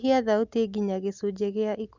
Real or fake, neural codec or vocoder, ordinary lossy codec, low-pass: real; none; Opus, 64 kbps; 7.2 kHz